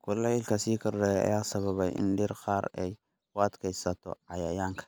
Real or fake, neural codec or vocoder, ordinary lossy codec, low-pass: real; none; none; none